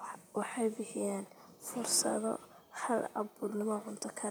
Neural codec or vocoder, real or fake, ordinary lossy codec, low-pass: vocoder, 44.1 kHz, 128 mel bands, Pupu-Vocoder; fake; none; none